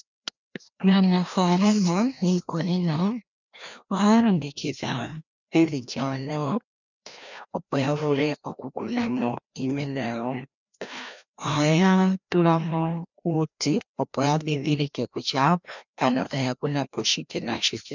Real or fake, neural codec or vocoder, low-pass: fake; codec, 16 kHz, 1 kbps, FreqCodec, larger model; 7.2 kHz